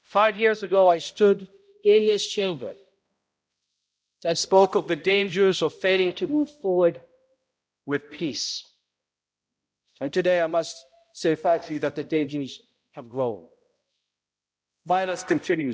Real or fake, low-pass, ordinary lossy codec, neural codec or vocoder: fake; none; none; codec, 16 kHz, 0.5 kbps, X-Codec, HuBERT features, trained on balanced general audio